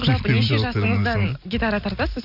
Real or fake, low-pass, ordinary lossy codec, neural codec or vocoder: real; 5.4 kHz; none; none